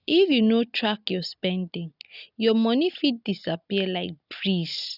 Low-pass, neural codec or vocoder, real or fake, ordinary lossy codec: 5.4 kHz; none; real; none